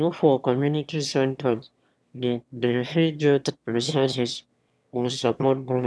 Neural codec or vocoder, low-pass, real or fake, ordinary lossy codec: autoencoder, 22.05 kHz, a latent of 192 numbers a frame, VITS, trained on one speaker; none; fake; none